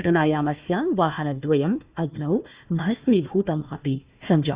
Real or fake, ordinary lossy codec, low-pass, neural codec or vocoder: fake; Opus, 64 kbps; 3.6 kHz; codec, 16 kHz, 1 kbps, FunCodec, trained on Chinese and English, 50 frames a second